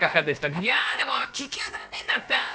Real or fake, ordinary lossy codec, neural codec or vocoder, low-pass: fake; none; codec, 16 kHz, about 1 kbps, DyCAST, with the encoder's durations; none